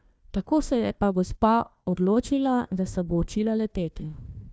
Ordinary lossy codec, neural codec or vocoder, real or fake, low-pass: none; codec, 16 kHz, 1 kbps, FunCodec, trained on Chinese and English, 50 frames a second; fake; none